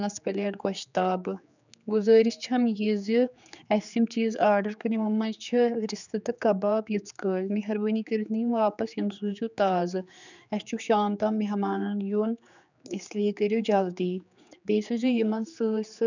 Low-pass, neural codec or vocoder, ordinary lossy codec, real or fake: 7.2 kHz; codec, 16 kHz, 4 kbps, X-Codec, HuBERT features, trained on general audio; none; fake